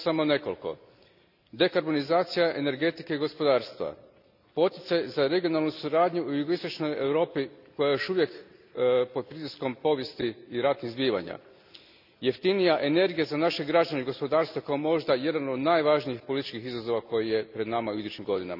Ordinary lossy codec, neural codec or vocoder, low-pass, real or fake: none; none; 5.4 kHz; real